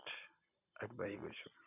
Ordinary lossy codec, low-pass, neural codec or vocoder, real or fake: none; 3.6 kHz; none; real